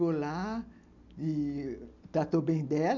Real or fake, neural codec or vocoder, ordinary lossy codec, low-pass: real; none; none; 7.2 kHz